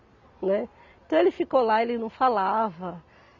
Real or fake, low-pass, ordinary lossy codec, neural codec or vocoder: real; 7.2 kHz; none; none